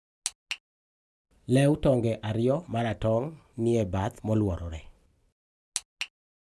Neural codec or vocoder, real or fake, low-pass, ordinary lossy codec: none; real; none; none